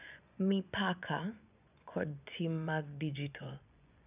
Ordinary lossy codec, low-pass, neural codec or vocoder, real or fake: none; 3.6 kHz; vocoder, 24 kHz, 100 mel bands, Vocos; fake